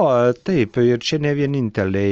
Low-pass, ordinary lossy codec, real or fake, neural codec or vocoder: 7.2 kHz; Opus, 24 kbps; real; none